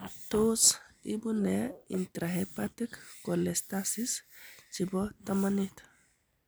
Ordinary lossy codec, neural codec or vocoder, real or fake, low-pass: none; none; real; none